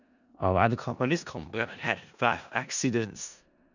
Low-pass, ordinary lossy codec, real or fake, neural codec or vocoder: 7.2 kHz; none; fake; codec, 16 kHz in and 24 kHz out, 0.4 kbps, LongCat-Audio-Codec, four codebook decoder